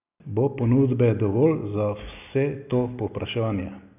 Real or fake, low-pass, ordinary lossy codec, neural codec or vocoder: real; 3.6 kHz; none; none